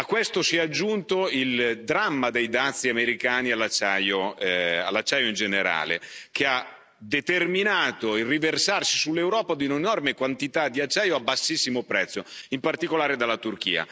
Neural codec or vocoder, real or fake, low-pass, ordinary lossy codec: none; real; none; none